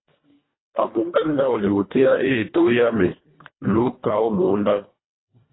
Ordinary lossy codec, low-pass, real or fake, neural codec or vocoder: AAC, 16 kbps; 7.2 kHz; fake; codec, 24 kHz, 1.5 kbps, HILCodec